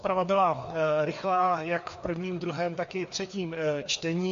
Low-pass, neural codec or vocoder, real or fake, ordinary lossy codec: 7.2 kHz; codec, 16 kHz, 2 kbps, FreqCodec, larger model; fake; MP3, 48 kbps